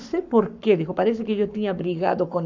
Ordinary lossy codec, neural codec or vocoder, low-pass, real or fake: none; codec, 44.1 kHz, 7.8 kbps, Pupu-Codec; 7.2 kHz; fake